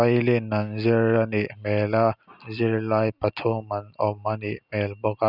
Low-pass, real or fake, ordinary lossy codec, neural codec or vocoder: 5.4 kHz; real; none; none